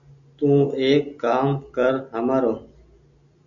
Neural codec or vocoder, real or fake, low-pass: none; real; 7.2 kHz